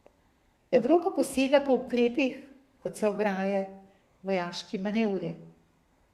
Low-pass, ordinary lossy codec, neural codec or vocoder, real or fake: 14.4 kHz; Opus, 64 kbps; codec, 32 kHz, 1.9 kbps, SNAC; fake